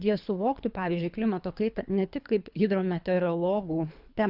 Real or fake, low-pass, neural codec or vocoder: fake; 5.4 kHz; codec, 24 kHz, 3 kbps, HILCodec